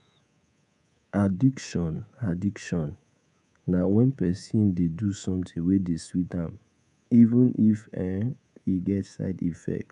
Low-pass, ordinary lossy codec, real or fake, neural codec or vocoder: 10.8 kHz; none; fake; codec, 24 kHz, 3.1 kbps, DualCodec